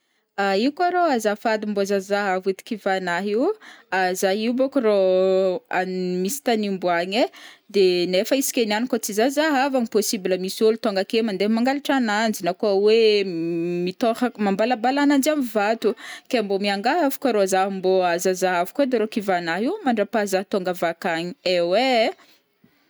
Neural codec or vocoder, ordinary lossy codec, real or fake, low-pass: none; none; real; none